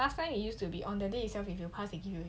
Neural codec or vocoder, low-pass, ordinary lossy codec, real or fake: none; none; none; real